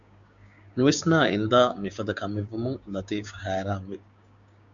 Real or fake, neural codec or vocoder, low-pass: fake; codec, 16 kHz, 6 kbps, DAC; 7.2 kHz